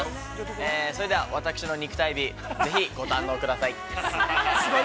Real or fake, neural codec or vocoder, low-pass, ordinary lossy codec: real; none; none; none